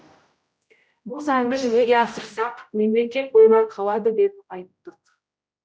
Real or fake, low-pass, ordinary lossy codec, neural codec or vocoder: fake; none; none; codec, 16 kHz, 0.5 kbps, X-Codec, HuBERT features, trained on general audio